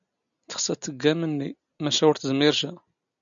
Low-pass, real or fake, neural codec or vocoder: 7.2 kHz; real; none